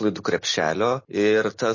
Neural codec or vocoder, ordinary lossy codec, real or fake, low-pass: none; MP3, 32 kbps; real; 7.2 kHz